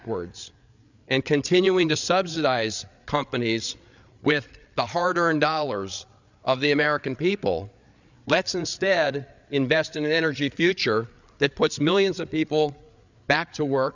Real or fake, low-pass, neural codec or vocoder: fake; 7.2 kHz; codec, 16 kHz, 8 kbps, FreqCodec, larger model